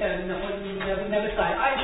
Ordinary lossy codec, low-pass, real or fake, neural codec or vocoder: AAC, 16 kbps; 19.8 kHz; fake; vocoder, 48 kHz, 128 mel bands, Vocos